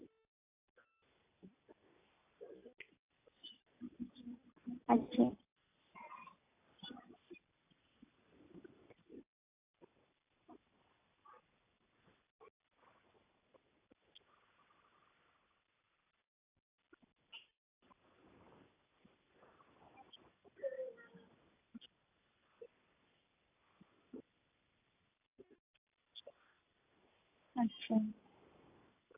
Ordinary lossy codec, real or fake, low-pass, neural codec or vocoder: AAC, 24 kbps; real; 3.6 kHz; none